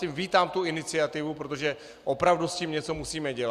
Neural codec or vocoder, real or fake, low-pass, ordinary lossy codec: none; real; 14.4 kHz; Opus, 64 kbps